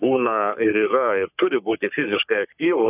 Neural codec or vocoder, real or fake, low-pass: codec, 44.1 kHz, 3.4 kbps, Pupu-Codec; fake; 3.6 kHz